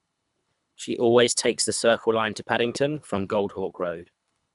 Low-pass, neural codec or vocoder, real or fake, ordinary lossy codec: 10.8 kHz; codec, 24 kHz, 3 kbps, HILCodec; fake; none